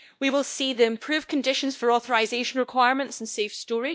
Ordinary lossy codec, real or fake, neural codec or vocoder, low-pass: none; fake; codec, 16 kHz, 1 kbps, X-Codec, WavLM features, trained on Multilingual LibriSpeech; none